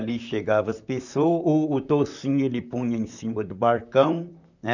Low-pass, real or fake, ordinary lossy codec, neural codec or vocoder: 7.2 kHz; fake; none; vocoder, 44.1 kHz, 128 mel bands, Pupu-Vocoder